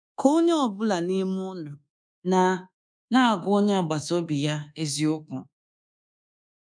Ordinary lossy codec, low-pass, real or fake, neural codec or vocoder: none; 9.9 kHz; fake; codec, 24 kHz, 1.2 kbps, DualCodec